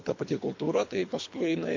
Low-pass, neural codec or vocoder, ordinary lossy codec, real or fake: 7.2 kHz; codec, 24 kHz, 3 kbps, HILCodec; MP3, 48 kbps; fake